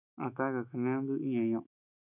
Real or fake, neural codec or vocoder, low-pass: fake; autoencoder, 48 kHz, 128 numbers a frame, DAC-VAE, trained on Japanese speech; 3.6 kHz